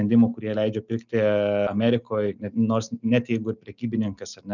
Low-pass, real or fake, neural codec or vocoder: 7.2 kHz; real; none